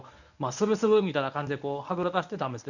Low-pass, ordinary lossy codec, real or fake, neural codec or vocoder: 7.2 kHz; none; fake; codec, 24 kHz, 0.9 kbps, WavTokenizer, medium speech release version 1